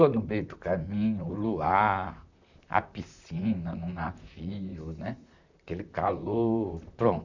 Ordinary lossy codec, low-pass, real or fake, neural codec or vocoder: none; 7.2 kHz; fake; vocoder, 44.1 kHz, 128 mel bands, Pupu-Vocoder